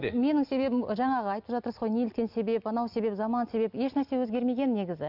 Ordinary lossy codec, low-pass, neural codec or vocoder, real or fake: none; 5.4 kHz; none; real